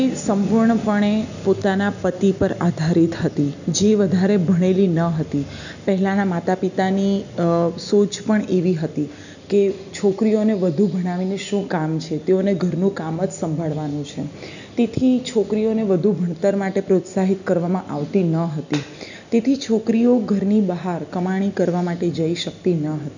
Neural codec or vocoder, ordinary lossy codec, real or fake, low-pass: none; none; real; 7.2 kHz